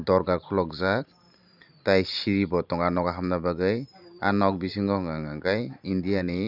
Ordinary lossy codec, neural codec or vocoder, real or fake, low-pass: none; vocoder, 44.1 kHz, 128 mel bands every 512 samples, BigVGAN v2; fake; 5.4 kHz